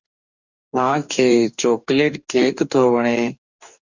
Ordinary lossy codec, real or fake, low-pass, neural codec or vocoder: Opus, 64 kbps; fake; 7.2 kHz; codec, 44.1 kHz, 2.6 kbps, DAC